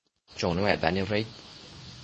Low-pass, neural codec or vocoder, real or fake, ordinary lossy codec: 10.8 kHz; codec, 24 kHz, 0.9 kbps, WavTokenizer, medium speech release version 2; fake; MP3, 32 kbps